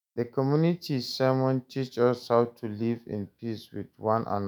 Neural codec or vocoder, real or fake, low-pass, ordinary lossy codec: autoencoder, 48 kHz, 128 numbers a frame, DAC-VAE, trained on Japanese speech; fake; none; none